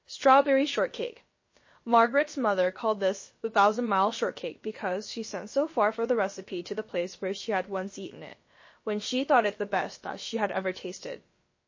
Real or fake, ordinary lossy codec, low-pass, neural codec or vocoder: fake; MP3, 32 kbps; 7.2 kHz; codec, 16 kHz, about 1 kbps, DyCAST, with the encoder's durations